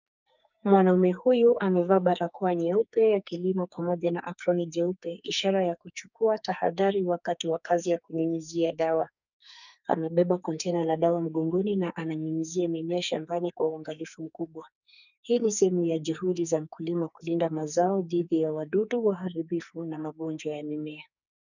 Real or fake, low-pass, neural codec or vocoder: fake; 7.2 kHz; codec, 44.1 kHz, 2.6 kbps, SNAC